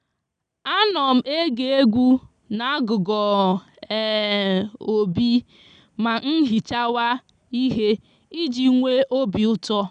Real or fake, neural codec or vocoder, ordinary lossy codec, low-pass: real; none; none; 9.9 kHz